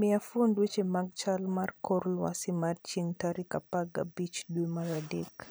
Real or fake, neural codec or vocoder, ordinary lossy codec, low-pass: fake; vocoder, 44.1 kHz, 128 mel bands every 512 samples, BigVGAN v2; none; none